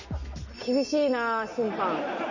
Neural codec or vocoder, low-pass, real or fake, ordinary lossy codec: none; 7.2 kHz; real; none